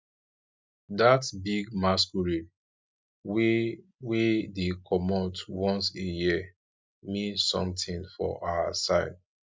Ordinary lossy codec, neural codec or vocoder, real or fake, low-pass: none; none; real; none